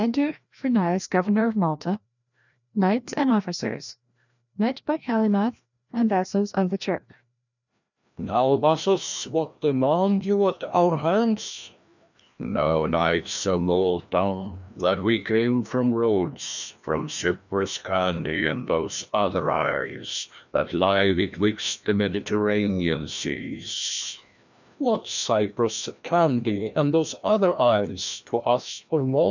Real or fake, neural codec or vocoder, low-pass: fake; codec, 16 kHz, 1 kbps, FreqCodec, larger model; 7.2 kHz